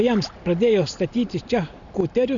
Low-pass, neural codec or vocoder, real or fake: 7.2 kHz; none; real